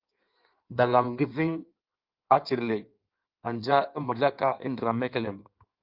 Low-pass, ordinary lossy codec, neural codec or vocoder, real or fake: 5.4 kHz; Opus, 24 kbps; codec, 16 kHz in and 24 kHz out, 1.1 kbps, FireRedTTS-2 codec; fake